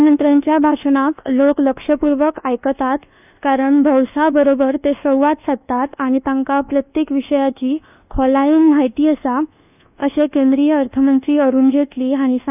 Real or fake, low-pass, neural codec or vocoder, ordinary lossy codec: fake; 3.6 kHz; codec, 24 kHz, 1.2 kbps, DualCodec; none